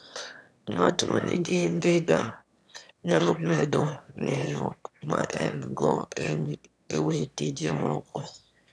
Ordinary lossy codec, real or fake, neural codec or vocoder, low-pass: none; fake; autoencoder, 22.05 kHz, a latent of 192 numbers a frame, VITS, trained on one speaker; none